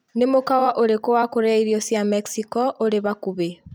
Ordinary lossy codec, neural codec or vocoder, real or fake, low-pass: none; vocoder, 44.1 kHz, 128 mel bands every 256 samples, BigVGAN v2; fake; none